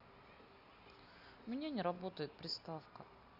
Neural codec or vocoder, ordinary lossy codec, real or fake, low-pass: none; none; real; 5.4 kHz